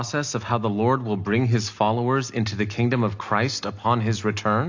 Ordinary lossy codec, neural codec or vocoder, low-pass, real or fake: AAC, 48 kbps; none; 7.2 kHz; real